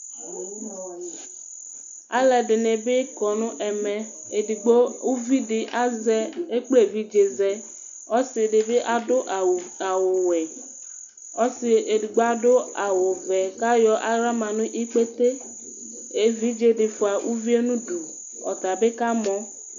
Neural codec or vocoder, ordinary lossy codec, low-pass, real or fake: none; AAC, 64 kbps; 7.2 kHz; real